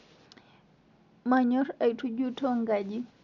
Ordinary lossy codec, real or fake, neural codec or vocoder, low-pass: none; real; none; 7.2 kHz